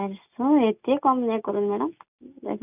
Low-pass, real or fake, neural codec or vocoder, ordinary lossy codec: 3.6 kHz; real; none; none